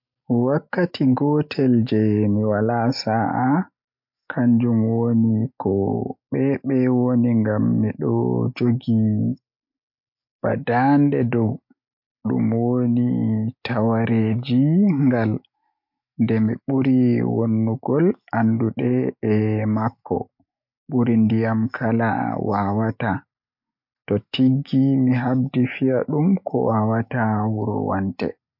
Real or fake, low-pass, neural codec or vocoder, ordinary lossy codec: real; 5.4 kHz; none; MP3, 48 kbps